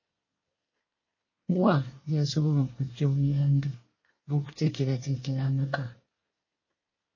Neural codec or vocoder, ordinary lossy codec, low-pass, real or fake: codec, 24 kHz, 1 kbps, SNAC; MP3, 32 kbps; 7.2 kHz; fake